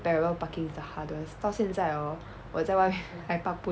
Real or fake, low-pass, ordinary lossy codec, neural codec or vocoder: real; none; none; none